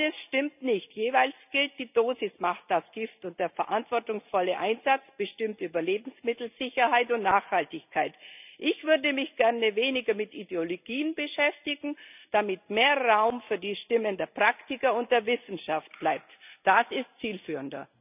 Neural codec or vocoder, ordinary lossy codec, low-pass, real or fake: none; none; 3.6 kHz; real